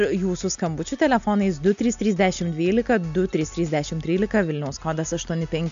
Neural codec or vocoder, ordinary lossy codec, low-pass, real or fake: none; MP3, 64 kbps; 7.2 kHz; real